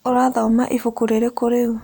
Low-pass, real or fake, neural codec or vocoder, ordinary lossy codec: none; real; none; none